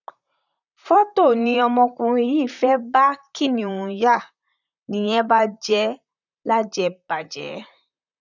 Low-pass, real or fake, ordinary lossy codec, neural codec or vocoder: 7.2 kHz; fake; none; vocoder, 44.1 kHz, 128 mel bands, Pupu-Vocoder